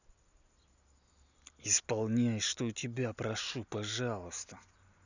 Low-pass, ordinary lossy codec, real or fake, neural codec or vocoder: 7.2 kHz; none; real; none